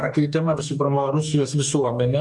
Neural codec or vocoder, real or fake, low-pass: codec, 44.1 kHz, 2.6 kbps, DAC; fake; 10.8 kHz